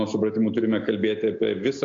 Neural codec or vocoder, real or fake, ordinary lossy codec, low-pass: none; real; AAC, 48 kbps; 7.2 kHz